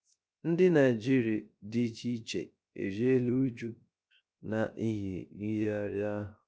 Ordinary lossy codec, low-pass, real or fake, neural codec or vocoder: none; none; fake; codec, 16 kHz, 0.3 kbps, FocalCodec